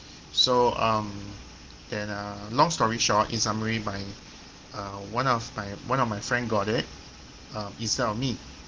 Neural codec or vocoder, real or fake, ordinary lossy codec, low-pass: none; real; Opus, 16 kbps; 7.2 kHz